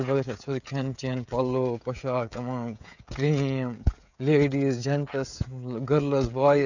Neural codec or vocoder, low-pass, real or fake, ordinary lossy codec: codec, 16 kHz, 16 kbps, FreqCodec, smaller model; 7.2 kHz; fake; none